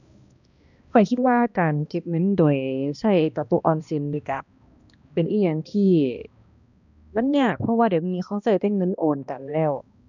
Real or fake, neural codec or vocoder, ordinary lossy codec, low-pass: fake; codec, 16 kHz, 1 kbps, X-Codec, HuBERT features, trained on balanced general audio; none; 7.2 kHz